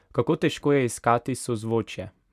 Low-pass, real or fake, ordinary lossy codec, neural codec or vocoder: 14.4 kHz; real; none; none